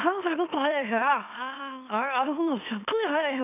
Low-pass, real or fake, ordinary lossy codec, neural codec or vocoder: 3.6 kHz; fake; none; autoencoder, 44.1 kHz, a latent of 192 numbers a frame, MeloTTS